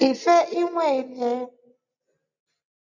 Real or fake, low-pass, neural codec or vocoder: real; 7.2 kHz; none